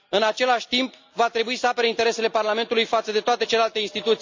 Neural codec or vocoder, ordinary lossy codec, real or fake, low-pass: none; MP3, 64 kbps; real; 7.2 kHz